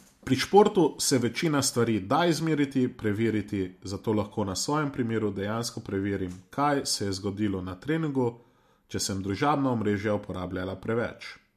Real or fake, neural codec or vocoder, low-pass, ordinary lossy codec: real; none; 14.4 kHz; MP3, 64 kbps